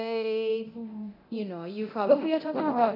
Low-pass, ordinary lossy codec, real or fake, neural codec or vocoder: 5.4 kHz; none; fake; codec, 24 kHz, 0.9 kbps, DualCodec